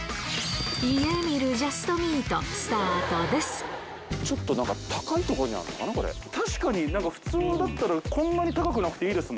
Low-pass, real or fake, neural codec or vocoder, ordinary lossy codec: none; real; none; none